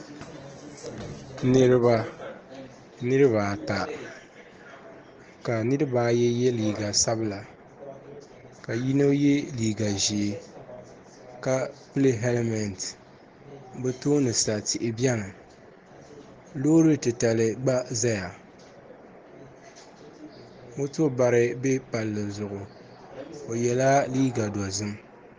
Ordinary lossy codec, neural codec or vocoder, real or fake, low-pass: Opus, 16 kbps; none; real; 7.2 kHz